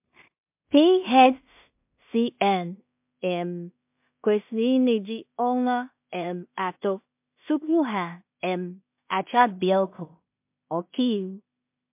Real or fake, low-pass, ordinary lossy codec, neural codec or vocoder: fake; 3.6 kHz; MP3, 32 kbps; codec, 16 kHz in and 24 kHz out, 0.4 kbps, LongCat-Audio-Codec, two codebook decoder